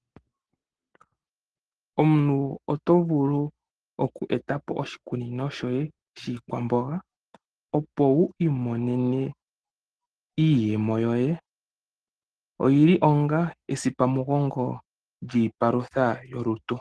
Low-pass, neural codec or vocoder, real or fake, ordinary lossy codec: 10.8 kHz; none; real; Opus, 16 kbps